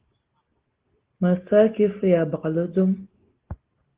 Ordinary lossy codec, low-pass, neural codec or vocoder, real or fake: Opus, 16 kbps; 3.6 kHz; none; real